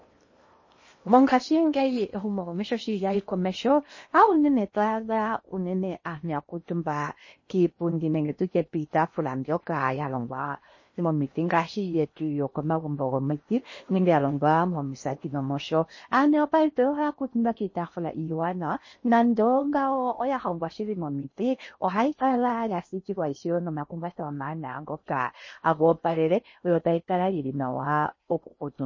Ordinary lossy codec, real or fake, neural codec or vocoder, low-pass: MP3, 32 kbps; fake; codec, 16 kHz in and 24 kHz out, 0.8 kbps, FocalCodec, streaming, 65536 codes; 7.2 kHz